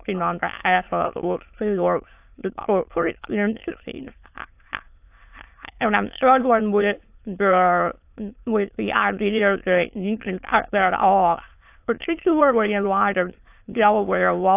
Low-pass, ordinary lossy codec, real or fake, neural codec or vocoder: 3.6 kHz; AAC, 32 kbps; fake; autoencoder, 22.05 kHz, a latent of 192 numbers a frame, VITS, trained on many speakers